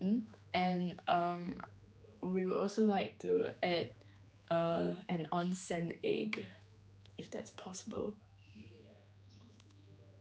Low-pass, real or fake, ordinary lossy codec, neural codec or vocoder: none; fake; none; codec, 16 kHz, 2 kbps, X-Codec, HuBERT features, trained on general audio